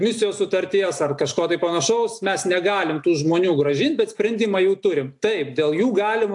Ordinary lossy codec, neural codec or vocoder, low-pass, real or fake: AAC, 64 kbps; none; 10.8 kHz; real